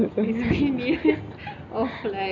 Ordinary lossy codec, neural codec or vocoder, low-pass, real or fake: none; none; 7.2 kHz; real